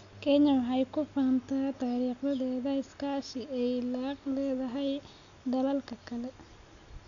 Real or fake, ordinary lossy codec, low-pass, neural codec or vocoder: real; MP3, 96 kbps; 7.2 kHz; none